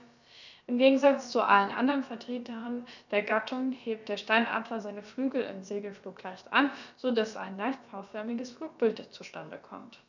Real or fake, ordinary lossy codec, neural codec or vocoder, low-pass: fake; none; codec, 16 kHz, about 1 kbps, DyCAST, with the encoder's durations; 7.2 kHz